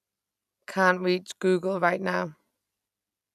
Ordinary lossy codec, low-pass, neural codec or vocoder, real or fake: none; 14.4 kHz; none; real